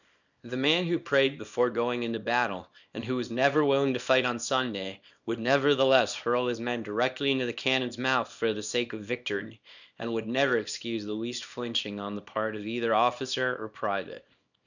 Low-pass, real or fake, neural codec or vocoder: 7.2 kHz; fake; codec, 24 kHz, 0.9 kbps, WavTokenizer, small release